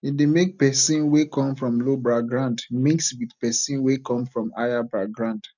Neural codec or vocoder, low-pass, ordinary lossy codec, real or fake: none; 7.2 kHz; none; real